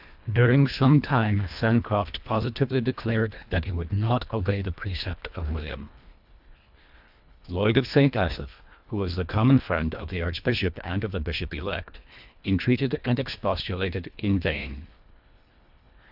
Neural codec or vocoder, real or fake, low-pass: codec, 24 kHz, 1.5 kbps, HILCodec; fake; 5.4 kHz